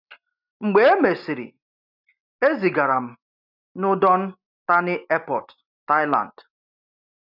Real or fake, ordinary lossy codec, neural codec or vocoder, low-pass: real; none; none; 5.4 kHz